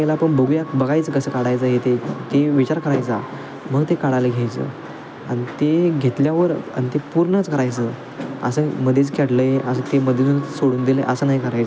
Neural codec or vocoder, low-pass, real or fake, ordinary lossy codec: none; none; real; none